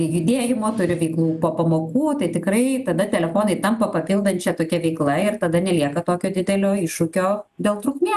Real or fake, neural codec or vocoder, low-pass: real; none; 14.4 kHz